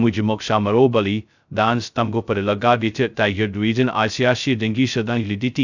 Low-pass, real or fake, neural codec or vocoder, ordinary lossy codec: 7.2 kHz; fake; codec, 16 kHz, 0.2 kbps, FocalCodec; none